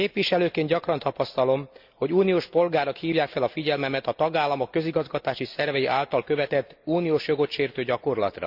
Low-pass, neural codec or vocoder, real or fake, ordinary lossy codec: 5.4 kHz; none; real; Opus, 64 kbps